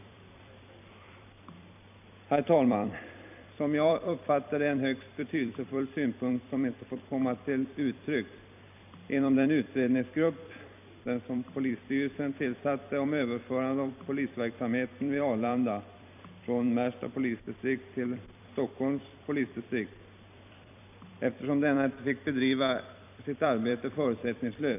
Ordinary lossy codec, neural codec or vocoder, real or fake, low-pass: AAC, 32 kbps; vocoder, 44.1 kHz, 128 mel bands every 256 samples, BigVGAN v2; fake; 3.6 kHz